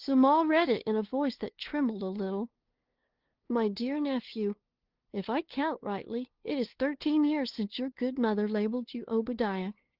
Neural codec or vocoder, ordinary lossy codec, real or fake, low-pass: codec, 16 kHz, 2 kbps, FunCodec, trained on LibriTTS, 25 frames a second; Opus, 16 kbps; fake; 5.4 kHz